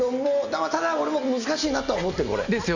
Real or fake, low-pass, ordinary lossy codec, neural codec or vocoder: real; 7.2 kHz; none; none